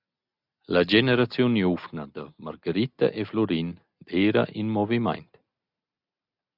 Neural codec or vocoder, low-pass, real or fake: none; 5.4 kHz; real